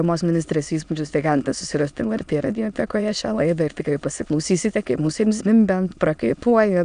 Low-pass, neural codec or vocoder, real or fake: 9.9 kHz; autoencoder, 22.05 kHz, a latent of 192 numbers a frame, VITS, trained on many speakers; fake